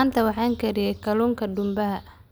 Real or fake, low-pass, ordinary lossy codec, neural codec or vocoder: real; none; none; none